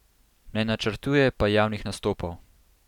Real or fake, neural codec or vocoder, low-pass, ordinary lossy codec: real; none; 19.8 kHz; none